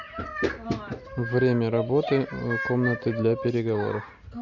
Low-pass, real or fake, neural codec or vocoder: 7.2 kHz; real; none